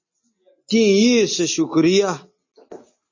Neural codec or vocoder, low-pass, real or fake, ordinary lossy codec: none; 7.2 kHz; real; MP3, 32 kbps